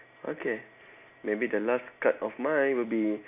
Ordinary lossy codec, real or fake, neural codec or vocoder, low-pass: none; real; none; 3.6 kHz